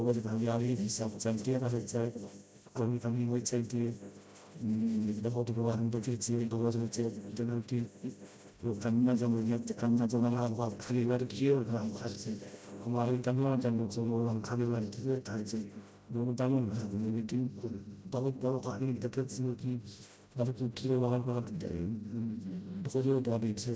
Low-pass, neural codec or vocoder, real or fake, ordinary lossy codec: none; codec, 16 kHz, 0.5 kbps, FreqCodec, smaller model; fake; none